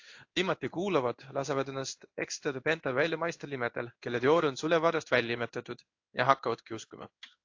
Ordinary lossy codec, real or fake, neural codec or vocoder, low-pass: AAC, 48 kbps; fake; codec, 16 kHz in and 24 kHz out, 1 kbps, XY-Tokenizer; 7.2 kHz